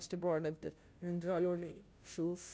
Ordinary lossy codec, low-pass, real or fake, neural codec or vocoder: none; none; fake; codec, 16 kHz, 0.5 kbps, FunCodec, trained on Chinese and English, 25 frames a second